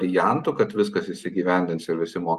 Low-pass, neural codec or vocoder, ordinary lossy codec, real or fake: 14.4 kHz; none; Opus, 32 kbps; real